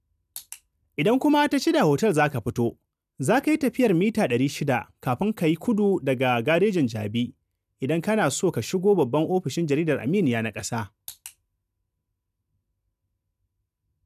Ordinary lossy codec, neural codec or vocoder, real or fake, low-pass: none; none; real; 14.4 kHz